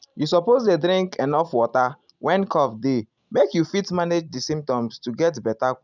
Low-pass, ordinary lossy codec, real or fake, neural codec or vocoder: 7.2 kHz; none; real; none